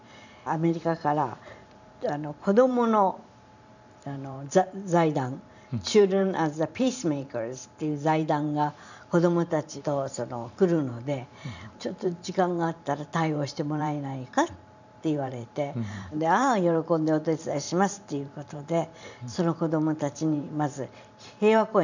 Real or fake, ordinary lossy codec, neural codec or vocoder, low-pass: fake; none; vocoder, 44.1 kHz, 128 mel bands every 512 samples, BigVGAN v2; 7.2 kHz